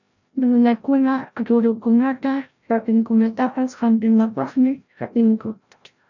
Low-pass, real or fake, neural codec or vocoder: 7.2 kHz; fake; codec, 16 kHz, 0.5 kbps, FreqCodec, larger model